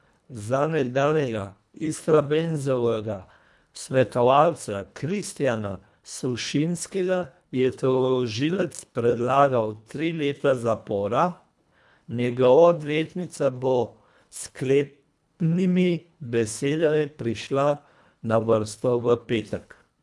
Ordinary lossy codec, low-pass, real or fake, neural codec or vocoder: none; none; fake; codec, 24 kHz, 1.5 kbps, HILCodec